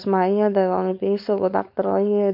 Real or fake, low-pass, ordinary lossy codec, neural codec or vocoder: fake; 5.4 kHz; none; codec, 16 kHz, 4.8 kbps, FACodec